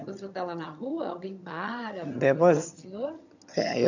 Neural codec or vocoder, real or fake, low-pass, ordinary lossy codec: vocoder, 22.05 kHz, 80 mel bands, HiFi-GAN; fake; 7.2 kHz; none